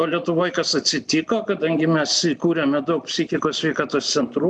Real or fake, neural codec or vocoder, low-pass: real; none; 9.9 kHz